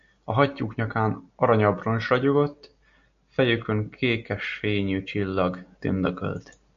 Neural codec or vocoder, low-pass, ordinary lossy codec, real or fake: none; 7.2 kHz; AAC, 96 kbps; real